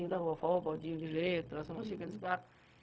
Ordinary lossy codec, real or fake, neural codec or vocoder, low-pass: none; fake; codec, 16 kHz, 0.4 kbps, LongCat-Audio-Codec; none